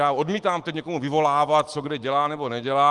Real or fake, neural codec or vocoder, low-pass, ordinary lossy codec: fake; autoencoder, 48 kHz, 128 numbers a frame, DAC-VAE, trained on Japanese speech; 10.8 kHz; Opus, 24 kbps